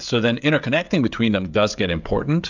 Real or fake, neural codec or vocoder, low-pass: fake; codec, 16 kHz, 16 kbps, FreqCodec, smaller model; 7.2 kHz